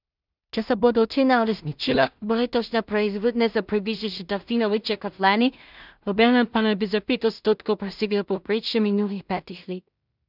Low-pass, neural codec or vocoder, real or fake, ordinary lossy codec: 5.4 kHz; codec, 16 kHz in and 24 kHz out, 0.4 kbps, LongCat-Audio-Codec, two codebook decoder; fake; none